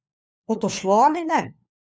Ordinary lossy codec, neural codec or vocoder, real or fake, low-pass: none; codec, 16 kHz, 4 kbps, FunCodec, trained on LibriTTS, 50 frames a second; fake; none